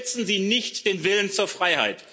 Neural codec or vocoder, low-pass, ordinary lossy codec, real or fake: none; none; none; real